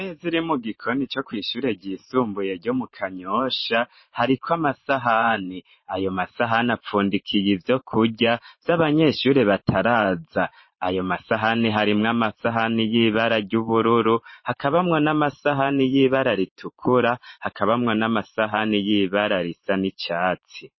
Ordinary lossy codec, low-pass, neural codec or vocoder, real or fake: MP3, 24 kbps; 7.2 kHz; vocoder, 44.1 kHz, 128 mel bands every 512 samples, BigVGAN v2; fake